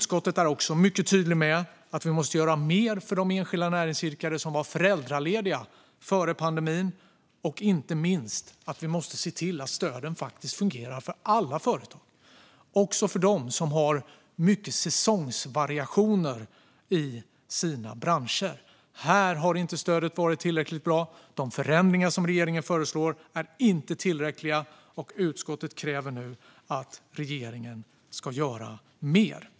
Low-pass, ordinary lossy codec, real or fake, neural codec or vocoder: none; none; real; none